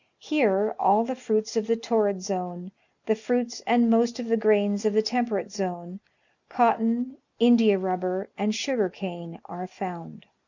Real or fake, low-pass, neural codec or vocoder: real; 7.2 kHz; none